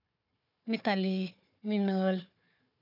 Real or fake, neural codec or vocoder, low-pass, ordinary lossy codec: fake; codec, 16 kHz, 4 kbps, FunCodec, trained on Chinese and English, 50 frames a second; 5.4 kHz; none